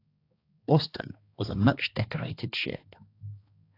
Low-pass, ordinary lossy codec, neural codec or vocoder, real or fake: 5.4 kHz; AAC, 32 kbps; codec, 16 kHz, 2 kbps, X-Codec, HuBERT features, trained on balanced general audio; fake